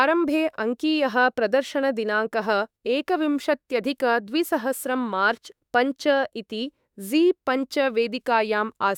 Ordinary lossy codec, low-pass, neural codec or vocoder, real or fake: none; 19.8 kHz; autoencoder, 48 kHz, 32 numbers a frame, DAC-VAE, trained on Japanese speech; fake